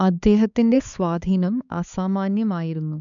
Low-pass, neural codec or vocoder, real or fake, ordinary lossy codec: 7.2 kHz; codec, 16 kHz, 4 kbps, X-Codec, HuBERT features, trained on balanced general audio; fake; none